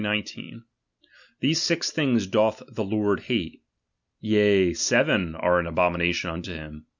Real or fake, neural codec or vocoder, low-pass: real; none; 7.2 kHz